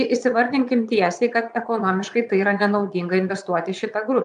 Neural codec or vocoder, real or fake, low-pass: vocoder, 22.05 kHz, 80 mel bands, Vocos; fake; 9.9 kHz